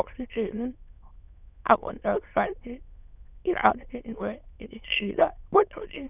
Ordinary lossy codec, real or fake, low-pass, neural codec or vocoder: none; fake; 3.6 kHz; autoencoder, 22.05 kHz, a latent of 192 numbers a frame, VITS, trained on many speakers